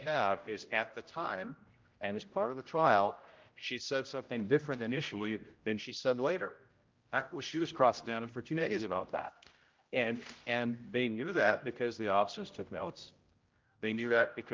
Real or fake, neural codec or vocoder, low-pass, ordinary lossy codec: fake; codec, 16 kHz, 0.5 kbps, X-Codec, HuBERT features, trained on general audio; 7.2 kHz; Opus, 32 kbps